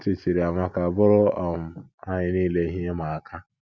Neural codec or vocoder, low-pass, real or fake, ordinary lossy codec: none; none; real; none